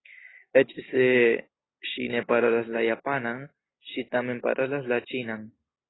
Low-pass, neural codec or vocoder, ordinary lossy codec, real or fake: 7.2 kHz; none; AAC, 16 kbps; real